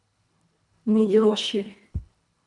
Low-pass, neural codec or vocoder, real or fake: 10.8 kHz; codec, 24 kHz, 1.5 kbps, HILCodec; fake